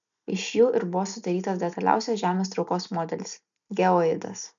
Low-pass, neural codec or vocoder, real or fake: 7.2 kHz; none; real